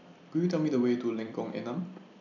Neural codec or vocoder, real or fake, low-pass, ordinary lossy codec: vocoder, 44.1 kHz, 128 mel bands every 256 samples, BigVGAN v2; fake; 7.2 kHz; none